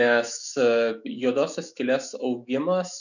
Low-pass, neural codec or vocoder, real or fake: 7.2 kHz; autoencoder, 48 kHz, 128 numbers a frame, DAC-VAE, trained on Japanese speech; fake